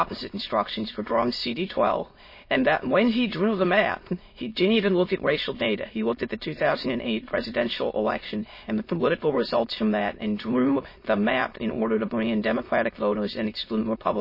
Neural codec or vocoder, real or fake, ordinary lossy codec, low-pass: autoencoder, 22.05 kHz, a latent of 192 numbers a frame, VITS, trained on many speakers; fake; MP3, 24 kbps; 5.4 kHz